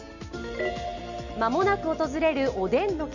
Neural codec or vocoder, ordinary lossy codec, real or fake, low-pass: none; none; real; 7.2 kHz